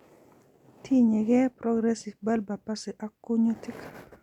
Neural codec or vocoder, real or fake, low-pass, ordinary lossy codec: none; real; 19.8 kHz; none